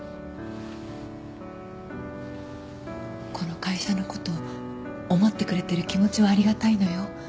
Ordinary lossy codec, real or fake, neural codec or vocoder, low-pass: none; real; none; none